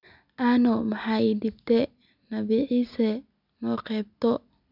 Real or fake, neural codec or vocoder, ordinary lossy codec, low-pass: real; none; none; 5.4 kHz